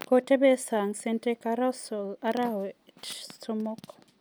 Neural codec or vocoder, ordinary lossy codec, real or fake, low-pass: none; none; real; none